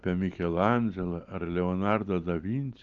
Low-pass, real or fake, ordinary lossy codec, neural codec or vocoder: 7.2 kHz; real; Opus, 24 kbps; none